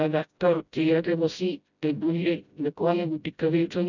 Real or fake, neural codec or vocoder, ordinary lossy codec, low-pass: fake; codec, 16 kHz, 0.5 kbps, FreqCodec, smaller model; none; 7.2 kHz